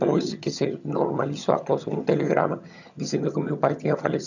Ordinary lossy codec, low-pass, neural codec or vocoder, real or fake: none; 7.2 kHz; vocoder, 22.05 kHz, 80 mel bands, HiFi-GAN; fake